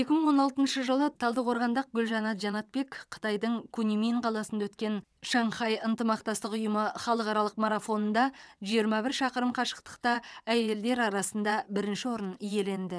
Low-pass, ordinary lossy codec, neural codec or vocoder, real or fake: none; none; vocoder, 22.05 kHz, 80 mel bands, WaveNeXt; fake